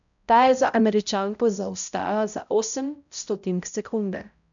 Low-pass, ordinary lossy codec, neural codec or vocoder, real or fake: 7.2 kHz; none; codec, 16 kHz, 0.5 kbps, X-Codec, HuBERT features, trained on balanced general audio; fake